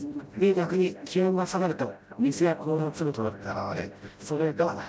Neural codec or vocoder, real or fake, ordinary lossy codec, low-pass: codec, 16 kHz, 0.5 kbps, FreqCodec, smaller model; fake; none; none